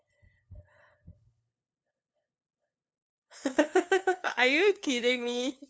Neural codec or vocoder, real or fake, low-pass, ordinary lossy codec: codec, 16 kHz, 2 kbps, FunCodec, trained on LibriTTS, 25 frames a second; fake; none; none